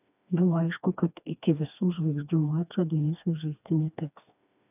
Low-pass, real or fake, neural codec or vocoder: 3.6 kHz; fake; codec, 16 kHz, 2 kbps, FreqCodec, smaller model